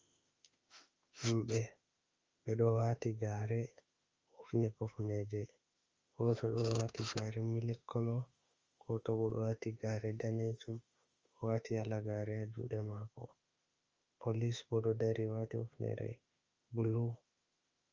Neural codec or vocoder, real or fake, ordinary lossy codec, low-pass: autoencoder, 48 kHz, 32 numbers a frame, DAC-VAE, trained on Japanese speech; fake; Opus, 32 kbps; 7.2 kHz